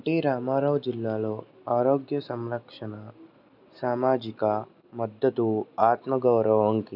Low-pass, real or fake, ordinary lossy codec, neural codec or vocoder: 5.4 kHz; real; none; none